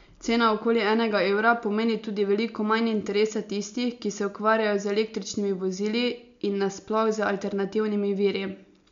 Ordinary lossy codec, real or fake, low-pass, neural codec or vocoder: MP3, 64 kbps; real; 7.2 kHz; none